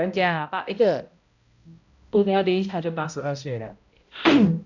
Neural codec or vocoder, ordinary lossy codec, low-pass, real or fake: codec, 16 kHz, 0.5 kbps, X-Codec, HuBERT features, trained on balanced general audio; none; 7.2 kHz; fake